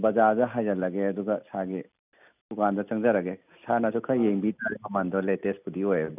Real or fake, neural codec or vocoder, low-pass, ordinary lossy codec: real; none; 3.6 kHz; none